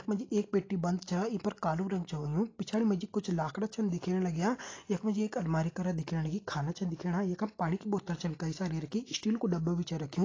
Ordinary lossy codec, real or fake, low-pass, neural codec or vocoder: AAC, 32 kbps; real; 7.2 kHz; none